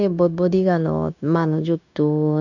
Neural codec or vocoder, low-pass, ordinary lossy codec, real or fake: codec, 16 kHz, 0.9 kbps, LongCat-Audio-Codec; 7.2 kHz; none; fake